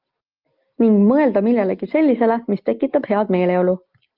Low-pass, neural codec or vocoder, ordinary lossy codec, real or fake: 5.4 kHz; none; Opus, 24 kbps; real